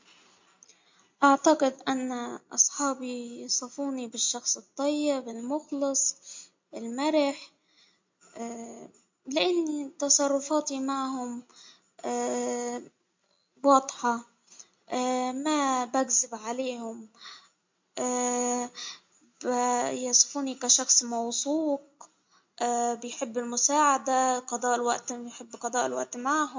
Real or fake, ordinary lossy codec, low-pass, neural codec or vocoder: real; MP3, 48 kbps; 7.2 kHz; none